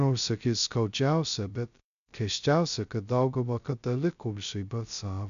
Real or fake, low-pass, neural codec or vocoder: fake; 7.2 kHz; codec, 16 kHz, 0.2 kbps, FocalCodec